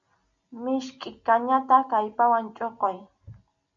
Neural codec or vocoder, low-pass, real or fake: none; 7.2 kHz; real